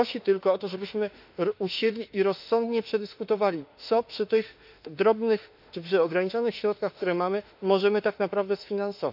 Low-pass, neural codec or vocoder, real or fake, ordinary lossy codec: 5.4 kHz; autoencoder, 48 kHz, 32 numbers a frame, DAC-VAE, trained on Japanese speech; fake; none